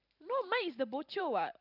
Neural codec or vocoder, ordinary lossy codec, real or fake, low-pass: vocoder, 44.1 kHz, 128 mel bands every 512 samples, BigVGAN v2; none; fake; 5.4 kHz